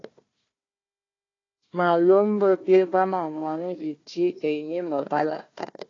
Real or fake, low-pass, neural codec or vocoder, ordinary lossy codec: fake; 7.2 kHz; codec, 16 kHz, 1 kbps, FunCodec, trained on Chinese and English, 50 frames a second; AAC, 32 kbps